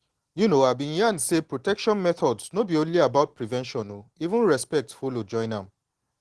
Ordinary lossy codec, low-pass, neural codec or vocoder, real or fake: Opus, 16 kbps; 10.8 kHz; none; real